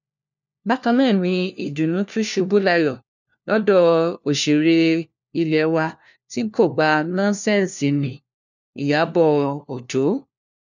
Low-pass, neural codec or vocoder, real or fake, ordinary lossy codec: 7.2 kHz; codec, 16 kHz, 1 kbps, FunCodec, trained on LibriTTS, 50 frames a second; fake; none